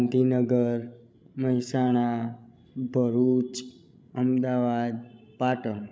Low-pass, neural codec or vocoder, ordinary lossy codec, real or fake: none; codec, 16 kHz, 16 kbps, FreqCodec, larger model; none; fake